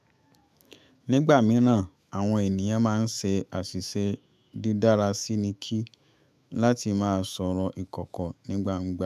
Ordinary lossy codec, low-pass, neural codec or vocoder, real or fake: none; 14.4 kHz; autoencoder, 48 kHz, 128 numbers a frame, DAC-VAE, trained on Japanese speech; fake